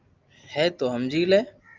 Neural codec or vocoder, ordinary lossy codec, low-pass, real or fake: none; Opus, 24 kbps; 7.2 kHz; real